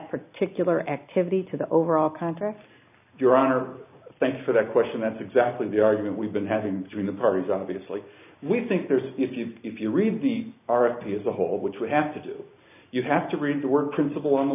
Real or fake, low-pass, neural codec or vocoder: real; 3.6 kHz; none